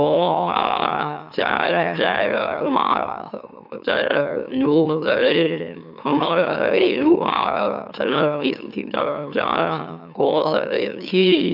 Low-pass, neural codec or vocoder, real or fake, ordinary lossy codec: 5.4 kHz; autoencoder, 44.1 kHz, a latent of 192 numbers a frame, MeloTTS; fake; none